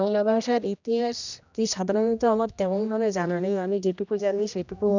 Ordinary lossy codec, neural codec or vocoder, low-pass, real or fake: none; codec, 16 kHz, 1 kbps, X-Codec, HuBERT features, trained on general audio; 7.2 kHz; fake